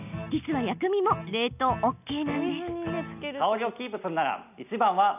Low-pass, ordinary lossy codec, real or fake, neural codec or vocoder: 3.6 kHz; none; real; none